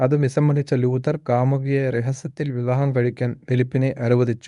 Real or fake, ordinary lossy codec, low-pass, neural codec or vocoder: fake; none; 10.8 kHz; codec, 24 kHz, 0.9 kbps, WavTokenizer, medium speech release version 1